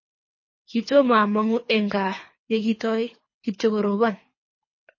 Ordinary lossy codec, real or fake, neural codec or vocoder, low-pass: MP3, 32 kbps; fake; codec, 24 kHz, 3 kbps, HILCodec; 7.2 kHz